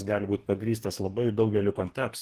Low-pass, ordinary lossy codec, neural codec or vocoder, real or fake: 14.4 kHz; Opus, 16 kbps; codec, 44.1 kHz, 2.6 kbps, DAC; fake